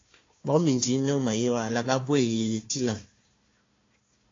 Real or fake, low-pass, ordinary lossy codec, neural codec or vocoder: fake; 7.2 kHz; AAC, 32 kbps; codec, 16 kHz, 1 kbps, FunCodec, trained on Chinese and English, 50 frames a second